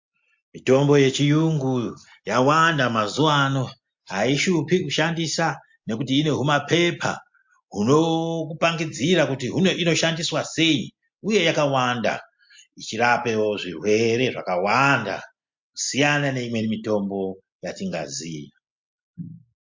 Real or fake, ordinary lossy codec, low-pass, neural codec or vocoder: real; MP3, 48 kbps; 7.2 kHz; none